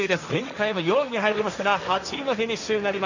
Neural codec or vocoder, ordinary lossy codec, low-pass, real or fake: codec, 16 kHz, 1.1 kbps, Voila-Tokenizer; none; 7.2 kHz; fake